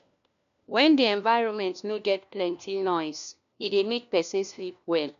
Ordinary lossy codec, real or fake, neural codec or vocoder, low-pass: none; fake; codec, 16 kHz, 1 kbps, FunCodec, trained on LibriTTS, 50 frames a second; 7.2 kHz